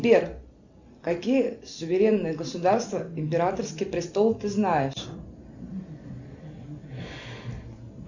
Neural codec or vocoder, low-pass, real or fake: none; 7.2 kHz; real